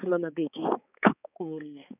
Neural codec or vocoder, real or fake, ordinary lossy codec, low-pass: codec, 16 kHz, 4 kbps, X-Codec, HuBERT features, trained on balanced general audio; fake; none; 3.6 kHz